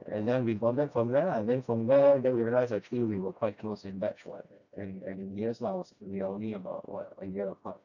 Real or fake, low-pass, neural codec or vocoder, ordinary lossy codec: fake; 7.2 kHz; codec, 16 kHz, 1 kbps, FreqCodec, smaller model; none